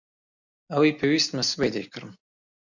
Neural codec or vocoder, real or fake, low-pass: none; real; 7.2 kHz